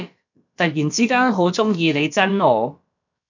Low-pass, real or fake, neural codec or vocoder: 7.2 kHz; fake; codec, 16 kHz, about 1 kbps, DyCAST, with the encoder's durations